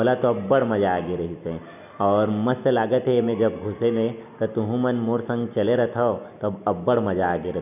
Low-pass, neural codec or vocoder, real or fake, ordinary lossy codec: 3.6 kHz; none; real; none